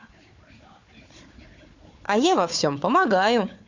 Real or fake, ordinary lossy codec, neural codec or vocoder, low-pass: fake; MP3, 48 kbps; codec, 16 kHz, 4 kbps, FunCodec, trained on Chinese and English, 50 frames a second; 7.2 kHz